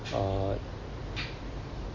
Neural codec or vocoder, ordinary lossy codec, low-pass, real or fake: none; MP3, 32 kbps; 7.2 kHz; real